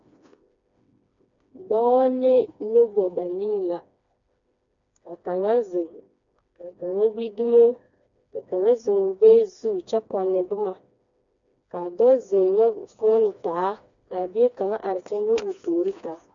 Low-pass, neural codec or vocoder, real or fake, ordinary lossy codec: 7.2 kHz; codec, 16 kHz, 2 kbps, FreqCodec, smaller model; fake; MP3, 64 kbps